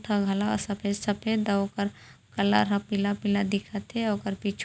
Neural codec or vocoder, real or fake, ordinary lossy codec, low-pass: none; real; none; none